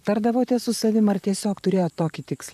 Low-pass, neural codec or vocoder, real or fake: 14.4 kHz; vocoder, 44.1 kHz, 128 mel bands, Pupu-Vocoder; fake